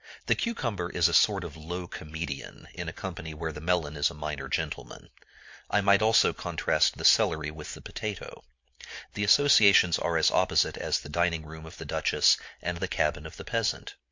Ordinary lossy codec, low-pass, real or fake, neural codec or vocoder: MP3, 48 kbps; 7.2 kHz; real; none